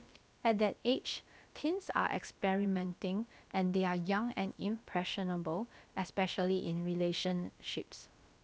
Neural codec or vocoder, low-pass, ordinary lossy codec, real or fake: codec, 16 kHz, about 1 kbps, DyCAST, with the encoder's durations; none; none; fake